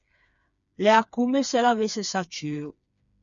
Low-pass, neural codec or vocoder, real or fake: 7.2 kHz; codec, 16 kHz, 4 kbps, FreqCodec, smaller model; fake